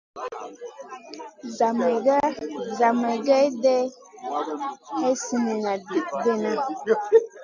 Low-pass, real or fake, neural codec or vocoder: 7.2 kHz; real; none